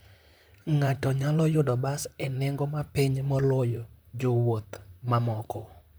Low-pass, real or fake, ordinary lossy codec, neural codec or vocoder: none; fake; none; vocoder, 44.1 kHz, 128 mel bands, Pupu-Vocoder